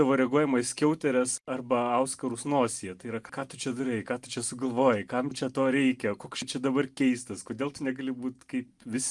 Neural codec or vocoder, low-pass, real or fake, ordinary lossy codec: none; 10.8 kHz; real; Opus, 32 kbps